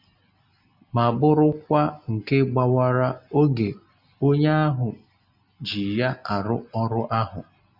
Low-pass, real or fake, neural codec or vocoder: 5.4 kHz; real; none